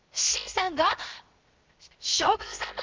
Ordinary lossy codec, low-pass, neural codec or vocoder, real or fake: Opus, 32 kbps; 7.2 kHz; codec, 16 kHz, 0.7 kbps, FocalCodec; fake